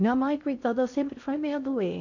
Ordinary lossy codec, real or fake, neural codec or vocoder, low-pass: none; fake; codec, 16 kHz in and 24 kHz out, 0.6 kbps, FocalCodec, streaming, 2048 codes; 7.2 kHz